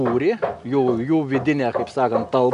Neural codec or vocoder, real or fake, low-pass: none; real; 10.8 kHz